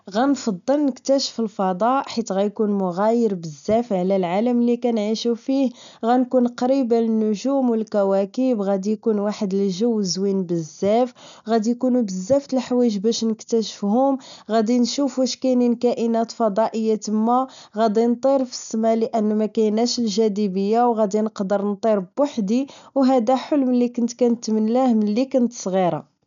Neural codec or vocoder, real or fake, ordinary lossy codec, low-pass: none; real; MP3, 96 kbps; 7.2 kHz